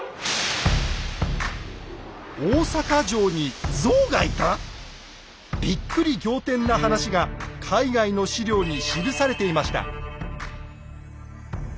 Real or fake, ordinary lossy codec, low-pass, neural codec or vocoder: real; none; none; none